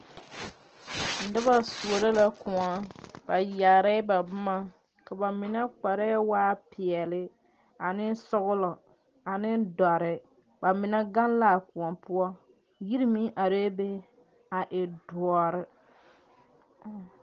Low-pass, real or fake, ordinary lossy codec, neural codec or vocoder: 7.2 kHz; real; Opus, 16 kbps; none